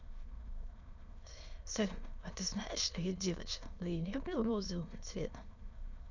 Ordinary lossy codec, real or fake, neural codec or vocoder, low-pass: none; fake; autoencoder, 22.05 kHz, a latent of 192 numbers a frame, VITS, trained on many speakers; 7.2 kHz